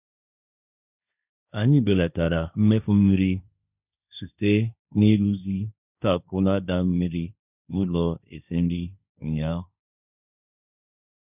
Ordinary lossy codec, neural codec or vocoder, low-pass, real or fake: none; codec, 16 kHz, 1.1 kbps, Voila-Tokenizer; 3.6 kHz; fake